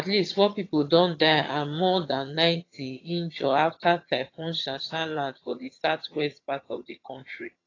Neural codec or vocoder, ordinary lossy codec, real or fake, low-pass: vocoder, 22.05 kHz, 80 mel bands, HiFi-GAN; AAC, 32 kbps; fake; 7.2 kHz